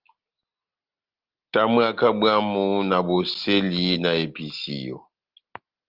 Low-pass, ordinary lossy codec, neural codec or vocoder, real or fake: 5.4 kHz; Opus, 32 kbps; none; real